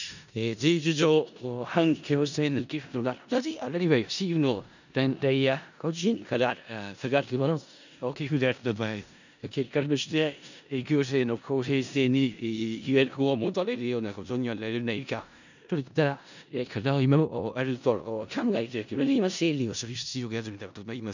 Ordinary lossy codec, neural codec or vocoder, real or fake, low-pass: none; codec, 16 kHz in and 24 kHz out, 0.4 kbps, LongCat-Audio-Codec, four codebook decoder; fake; 7.2 kHz